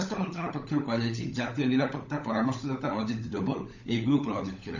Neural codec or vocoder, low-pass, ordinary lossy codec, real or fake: codec, 16 kHz, 16 kbps, FunCodec, trained on LibriTTS, 50 frames a second; 7.2 kHz; none; fake